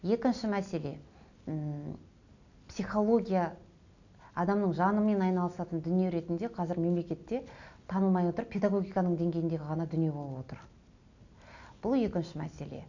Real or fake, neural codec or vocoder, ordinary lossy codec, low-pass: real; none; none; 7.2 kHz